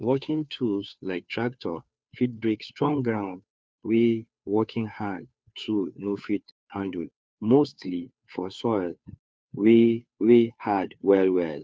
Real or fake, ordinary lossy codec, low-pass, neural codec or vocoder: fake; none; none; codec, 16 kHz, 2 kbps, FunCodec, trained on Chinese and English, 25 frames a second